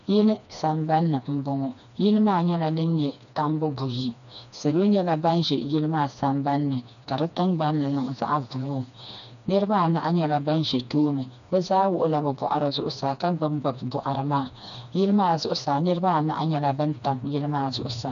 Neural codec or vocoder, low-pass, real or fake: codec, 16 kHz, 2 kbps, FreqCodec, smaller model; 7.2 kHz; fake